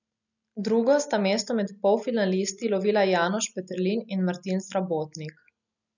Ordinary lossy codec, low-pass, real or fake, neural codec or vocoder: none; 7.2 kHz; real; none